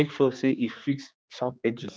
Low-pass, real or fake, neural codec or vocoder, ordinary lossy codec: none; fake; codec, 16 kHz, 2 kbps, X-Codec, HuBERT features, trained on general audio; none